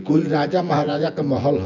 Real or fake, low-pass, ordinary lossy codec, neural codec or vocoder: fake; 7.2 kHz; none; vocoder, 24 kHz, 100 mel bands, Vocos